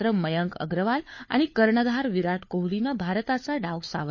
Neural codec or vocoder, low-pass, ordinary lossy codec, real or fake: codec, 16 kHz, 4 kbps, FunCodec, trained on LibriTTS, 50 frames a second; 7.2 kHz; MP3, 32 kbps; fake